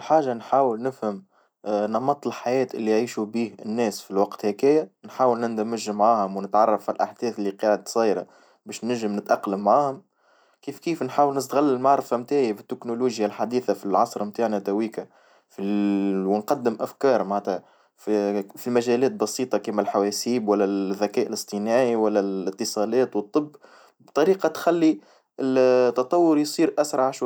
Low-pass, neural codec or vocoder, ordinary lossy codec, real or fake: none; none; none; real